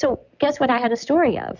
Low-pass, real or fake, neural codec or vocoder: 7.2 kHz; real; none